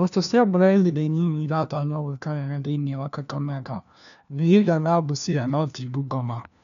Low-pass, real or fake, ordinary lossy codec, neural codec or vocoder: 7.2 kHz; fake; none; codec, 16 kHz, 1 kbps, FunCodec, trained on LibriTTS, 50 frames a second